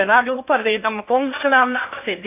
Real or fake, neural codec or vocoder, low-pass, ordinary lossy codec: fake; codec, 16 kHz in and 24 kHz out, 0.8 kbps, FocalCodec, streaming, 65536 codes; 3.6 kHz; AAC, 32 kbps